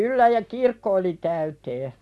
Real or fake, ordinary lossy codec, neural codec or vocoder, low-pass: real; none; none; none